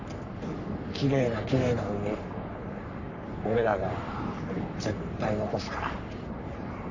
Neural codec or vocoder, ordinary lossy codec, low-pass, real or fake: codec, 44.1 kHz, 3.4 kbps, Pupu-Codec; none; 7.2 kHz; fake